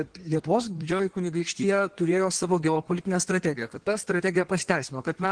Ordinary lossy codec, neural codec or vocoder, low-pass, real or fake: Opus, 16 kbps; codec, 16 kHz in and 24 kHz out, 1.1 kbps, FireRedTTS-2 codec; 9.9 kHz; fake